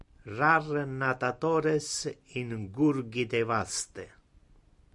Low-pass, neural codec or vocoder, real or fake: 10.8 kHz; none; real